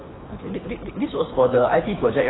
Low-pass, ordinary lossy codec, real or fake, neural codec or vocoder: 7.2 kHz; AAC, 16 kbps; fake; codec, 24 kHz, 6 kbps, HILCodec